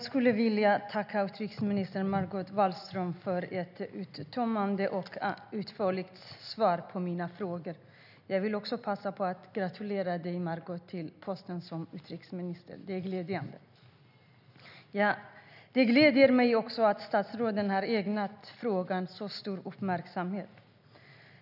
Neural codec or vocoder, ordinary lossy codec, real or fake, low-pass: none; none; real; 5.4 kHz